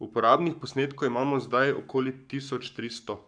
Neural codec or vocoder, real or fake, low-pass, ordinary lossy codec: codec, 44.1 kHz, 7.8 kbps, Pupu-Codec; fake; 9.9 kHz; none